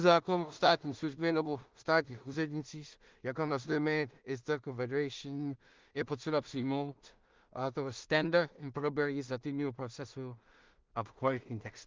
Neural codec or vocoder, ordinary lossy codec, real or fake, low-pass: codec, 16 kHz in and 24 kHz out, 0.4 kbps, LongCat-Audio-Codec, two codebook decoder; Opus, 32 kbps; fake; 7.2 kHz